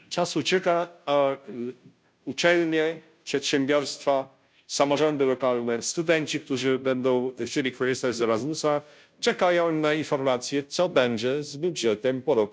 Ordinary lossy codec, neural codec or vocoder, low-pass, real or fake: none; codec, 16 kHz, 0.5 kbps, FunCodec, trained on Chinese and English, 25 frames a second; none; fake